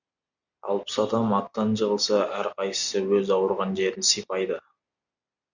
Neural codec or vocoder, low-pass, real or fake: none; 7.2 kHz; real